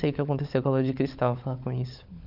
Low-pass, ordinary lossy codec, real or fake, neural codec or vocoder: 5.4 kHz; none; fake; codec, 16 kHz, 16 kbps, FunCodec, trained on LibriTTS, 50 frames a second